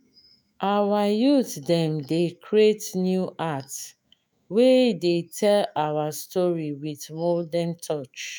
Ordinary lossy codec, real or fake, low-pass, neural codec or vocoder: none; fake; none; autoencoder, 48 kHz, 128 numbers a frame, DAC-VAE, trained on Japanese speech